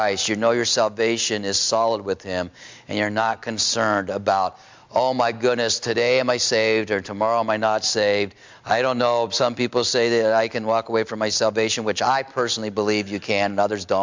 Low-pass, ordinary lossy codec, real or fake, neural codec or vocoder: 7.2 kHz; MP3, 64 kbps; real; none